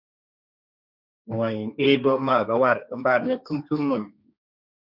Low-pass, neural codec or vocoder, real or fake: 5.4 kHz; codec, 16 kHz, 1.1 kbps, Voila-Tokenizer; fake